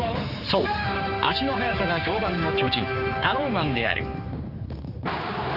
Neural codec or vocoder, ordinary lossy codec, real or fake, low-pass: codec, 16 kHz, 4 kbps, X-Codec, HuBERT features, trained on general audio; Opus, 32 kbps; fake; 5.4 kHz